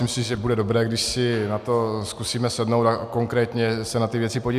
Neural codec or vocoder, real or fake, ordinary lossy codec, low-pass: none; real; AAC, 96 kbps; 14.4 kHz